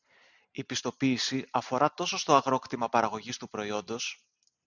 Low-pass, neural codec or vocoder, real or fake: 7.2 kHz; none; real